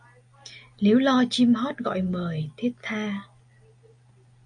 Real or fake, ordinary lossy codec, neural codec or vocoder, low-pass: real; MP3, 64 kbps; none; 9.9 kHz